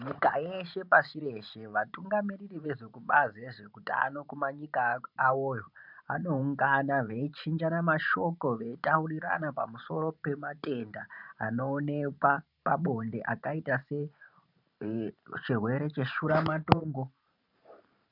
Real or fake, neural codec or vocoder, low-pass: fake; vocoder, 44.1 kHz, 128 mel bands every 512 samples, BigVGAN v2; 5.4 kHz